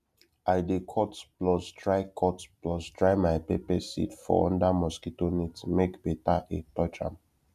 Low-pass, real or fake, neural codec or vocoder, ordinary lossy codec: 14.4 kHz; real; none; none